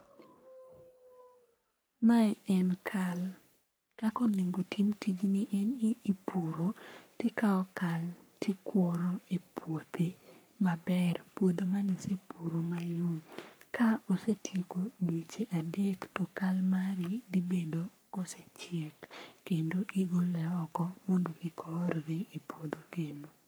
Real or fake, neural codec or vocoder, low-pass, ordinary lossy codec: fake; codec, 44.1 kHz, 3.4 kbps, Pupu-Codec; none; none